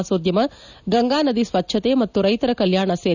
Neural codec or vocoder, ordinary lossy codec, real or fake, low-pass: none; none; real; 7.2 kHz